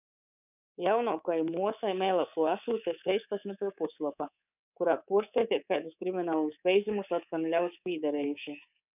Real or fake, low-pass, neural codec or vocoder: fake; 3.6 kHz; codec, 24 kHz, 3.1 kbps, DualCodec